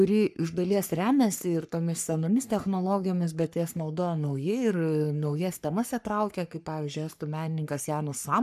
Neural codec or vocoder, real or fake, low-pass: codec, 44.1 kHz, 3.4 kbps, Pupu-Codec; fake; 14.4 kHz